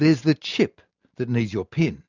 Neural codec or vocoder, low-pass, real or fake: none; 7.2 kHz; real